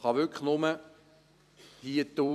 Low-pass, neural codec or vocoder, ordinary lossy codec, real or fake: 14.4 kHz; vocoder, 44.1 kHz, 128 mel bands every 256 samples, BigVGAN v2; none; fake